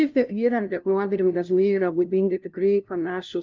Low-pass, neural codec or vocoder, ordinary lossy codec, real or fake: 7.2 kHz; codec, 16 kHz, 0.5 kbps, FunCodec, trained on LibriTTS, 25 frames a second; Opus, 24 kbps; fake